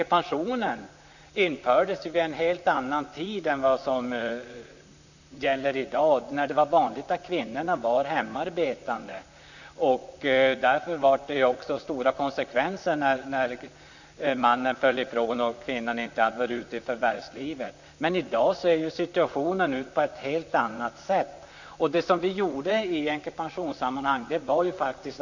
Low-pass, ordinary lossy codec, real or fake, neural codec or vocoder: 7.2 kHz; none; fake; vocoder, 44.1 kHz, 128 mel bands, Pupu-Vocoder